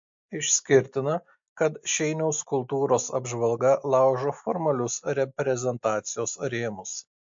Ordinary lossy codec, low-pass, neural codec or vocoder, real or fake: MP3, 48 kbps; 7.2 kHz; none; real